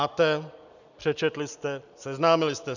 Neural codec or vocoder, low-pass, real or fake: none; 7.2 kHz; real